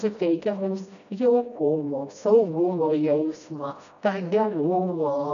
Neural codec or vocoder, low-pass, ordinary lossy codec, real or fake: codec, 16 kHz, 1 kbps, FreqCodec, smaller model; 7.2 kHz; MP3, 96 kbps; fake